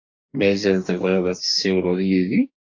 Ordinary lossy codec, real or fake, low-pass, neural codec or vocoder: AAC, 48 kbps; fake; 7.2 kHz; codec, 44.1 kHz, 2.6 kbps, DAC